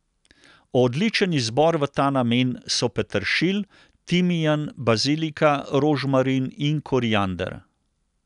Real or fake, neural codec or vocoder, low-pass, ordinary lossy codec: real; none; 10.8 kHz; none